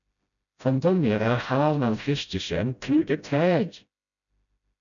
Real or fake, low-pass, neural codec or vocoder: fake; 7.2 kHz; codec, 16 kHz, 0.5 kbps, FreqCodec, smaller model